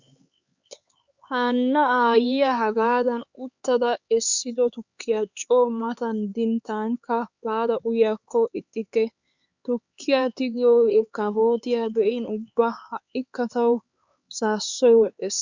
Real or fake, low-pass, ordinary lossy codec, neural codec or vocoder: fake; 7.2 kHz; Opus, 64 kbps; codec, 16 kHz, 4 kbps, X-Codec, HuBERT features, trained on LibriSpeech